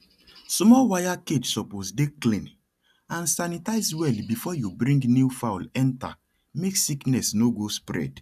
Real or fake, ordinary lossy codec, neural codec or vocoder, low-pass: real; none; none; 14.4 kHz